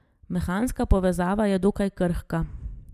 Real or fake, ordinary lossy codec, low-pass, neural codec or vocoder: real; none; 14.4 kHz; none